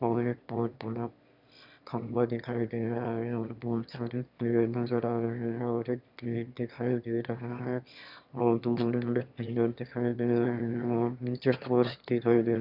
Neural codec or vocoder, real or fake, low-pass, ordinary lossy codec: autoencoder, 22.05 kHz, a latent of 192 numbers a frame, VITS, trained on one speaker; fake; 5.4 kHz; none